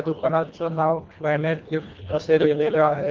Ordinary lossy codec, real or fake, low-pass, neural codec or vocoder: Opus, 32 kbps; fake; 7.2 kHz; codec, 24 kHz, 1.5 kbps, HILCodec